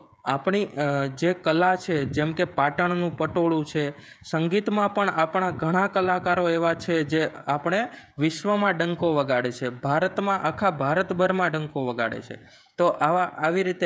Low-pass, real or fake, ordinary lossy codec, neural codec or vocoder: none; fake; none; codec, 16 kHz, 16 kbps, FreqCodec, smaller model